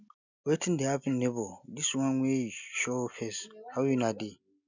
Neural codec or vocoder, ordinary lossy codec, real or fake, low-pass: none; none; real; 7.2 kHz